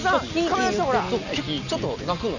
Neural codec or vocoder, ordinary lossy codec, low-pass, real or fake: none; none; 7.2 kHz; real